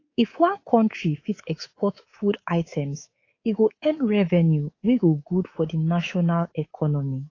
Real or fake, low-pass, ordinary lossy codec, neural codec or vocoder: fake; 7.2 kHz; AAC, 32 kbps; codec, 24 kHz, 3.1 kbps, DualCodec